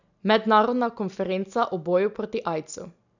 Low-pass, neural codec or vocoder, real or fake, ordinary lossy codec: 7.2 kHz; none; real; none